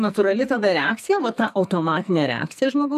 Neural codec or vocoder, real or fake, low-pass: codec, 44.1 kHz, 2.6 kbps, SNAC; fake; 14.4 kHz